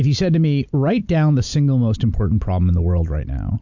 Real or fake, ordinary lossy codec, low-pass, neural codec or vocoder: real; MP3, 64 kbps; 7.2 kHz; none